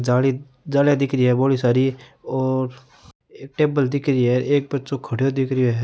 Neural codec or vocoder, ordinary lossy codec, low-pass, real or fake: none; none; none; real